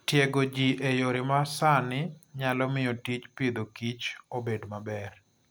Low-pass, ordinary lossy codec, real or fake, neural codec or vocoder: none; none; real; none